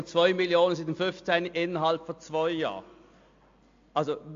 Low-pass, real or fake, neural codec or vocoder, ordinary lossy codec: 7.2 kHz; real; none; AAC, 48 kbps